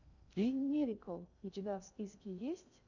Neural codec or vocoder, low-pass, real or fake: codec, 16 kHz in and 24 kHz out, 0.6 kbps, FocalCodec, streaming, 2048 codes; 7.2 kHz; fake